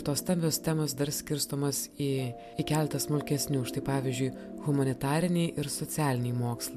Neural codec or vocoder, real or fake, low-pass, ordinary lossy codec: none; real; 14.4 kHz; MP3, 96 kbps